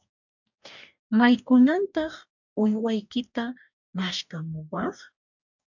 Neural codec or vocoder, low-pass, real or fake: codec, 44.1 kHz, 2.6 kbps, DAC; 7.2 kHz; fake